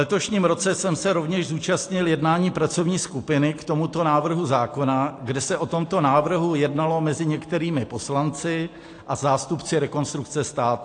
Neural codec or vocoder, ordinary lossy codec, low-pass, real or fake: none; AAC, 48 kbps; 9.9 kHz; real